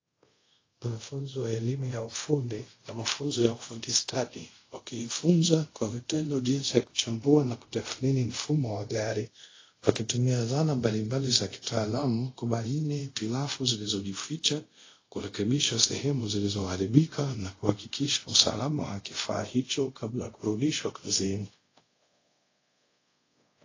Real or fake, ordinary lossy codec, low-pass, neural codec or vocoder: fake; AAC, 32 kbps; 7.2 kHz; codec, 24 kHz, 0.5 kbps, DualCodec